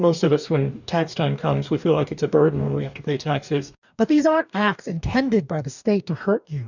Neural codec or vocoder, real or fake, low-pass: codec, 44.1 kHz, 2.6 kbps, DAC; fake; 7.2 kHz